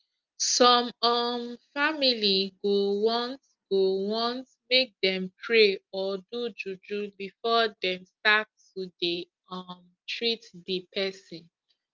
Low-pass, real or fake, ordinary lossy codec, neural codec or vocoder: 7.2 kHz; real; Opus, 24 kbps; none